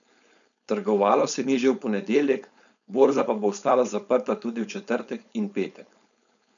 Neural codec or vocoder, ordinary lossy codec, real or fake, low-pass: codec, 16 kHz, 4.8 kbps, FACodec; none; fake; 7.2 kHz